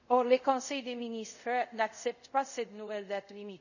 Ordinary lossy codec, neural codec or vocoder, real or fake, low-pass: none; codec, 24 kHz, 0.5 kbps, DualCodec; fake; 7.2 kHz